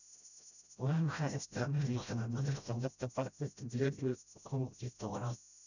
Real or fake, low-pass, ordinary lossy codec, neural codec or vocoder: fake; 7.2 kHz; none; codec, 16 kHz, 0.5 kbps, FreqCodec, smaller model